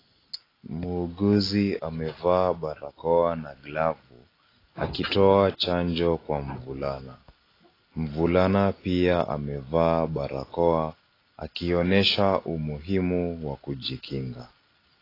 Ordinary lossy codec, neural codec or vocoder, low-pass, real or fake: AAC, 24 kbps; none; 5.4 kHz; real